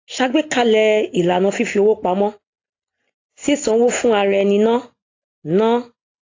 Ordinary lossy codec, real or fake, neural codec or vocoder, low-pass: AAC, 32 kbps; real; none; 7.2 kHz